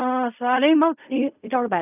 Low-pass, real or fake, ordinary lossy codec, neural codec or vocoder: 3.6 kHz; fake; none; codec, 16 kHz in and 24 kHz out, 0.4 kbps, LongCat-Audio-Codec, fine tuned four codebook decoder